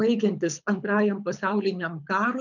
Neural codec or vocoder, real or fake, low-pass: codec, 24 kHz, 6 kbps, HILCodec; fake; 7.2 kHz